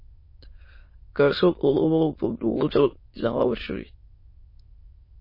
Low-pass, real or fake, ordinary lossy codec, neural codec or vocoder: 5.4 kHz; fake; MP3, 24 kbps; autoencoder, 22.05 kHz, a latent of 192 numbers a frame, VITS, trained on many speakers